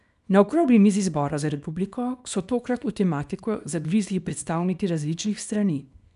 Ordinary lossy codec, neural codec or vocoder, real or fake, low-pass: none; codec, 24 kHz, 0.9 kbps, WavTokenizer, small release; fake; 10.8 kHz